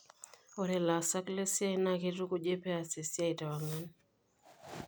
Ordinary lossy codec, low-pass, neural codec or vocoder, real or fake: none; none; none; real